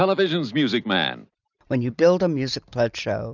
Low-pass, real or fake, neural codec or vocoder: 7.2 kHz; real; none